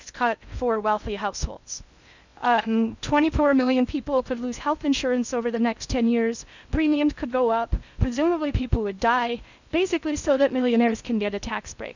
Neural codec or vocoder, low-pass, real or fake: codec, 16 kHz in and 24 kHz out, 0.6 kbps, FocalCodec, streaming, 2048 codes; 7.2 kHz; fake